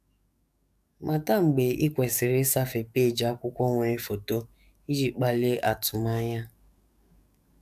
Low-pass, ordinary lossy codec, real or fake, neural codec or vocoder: 14.4 kHz; none; fake; codec, 44.1 kHz, 7.8 kbps, DAC